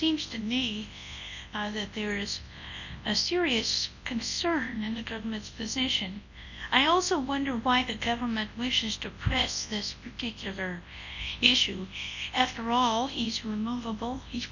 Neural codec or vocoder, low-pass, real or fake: codec, 24 kHz, 0.9 kbps, WavTokenizer, large speech release; 7.2 kHz; fake